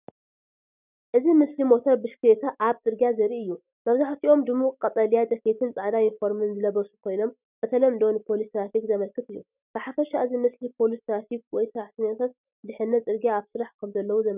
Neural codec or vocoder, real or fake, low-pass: none; real; 3.6 kHz